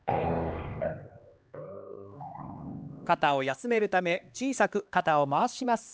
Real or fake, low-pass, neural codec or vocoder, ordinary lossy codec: fake; none; codec, 16 kHz, 2 kbps, X-Codec, HuBERT features, trained on LibriSpeech; none